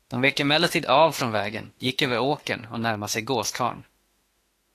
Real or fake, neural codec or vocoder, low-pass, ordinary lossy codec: fake; autoencoder, 48 kHz, 32 numbers a frame, DAC-VAE, trained on Japanese speech; 14.4 kHz; AAC, 48 kbps